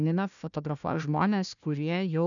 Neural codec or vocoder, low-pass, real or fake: codec, 16 kHz, 1 kbps, FunCodec, trained on Chinese and English, 50 frames a second; 7.2 kHz; fake